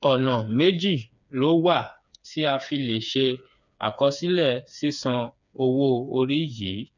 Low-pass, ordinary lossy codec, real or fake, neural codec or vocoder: 7.2 kHz; none; fake; codec, 16 kHz, 4 kbps, FreqCodec, smaller model